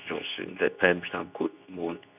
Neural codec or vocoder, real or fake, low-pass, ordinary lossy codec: codec, 24 kHz, 0.9 kbps, WavTokenizer, medium speech release version 2; fake; 3.6 kHz; none